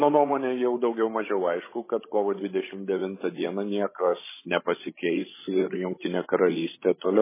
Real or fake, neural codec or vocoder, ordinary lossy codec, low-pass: fake; codec, 16 kHz, 16 kbps, FreqCodec, larger model; MP3, 16 kbps; 3.6 kHz